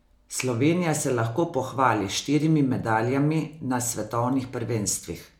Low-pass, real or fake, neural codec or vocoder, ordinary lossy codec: 19.8 kHz; real; none; MP3, 96 kbps